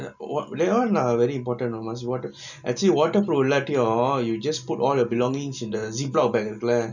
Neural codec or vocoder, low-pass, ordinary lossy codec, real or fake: none; 7.2 kHz; none; real